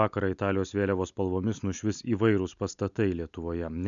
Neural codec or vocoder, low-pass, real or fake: none; 7.2 kHz; real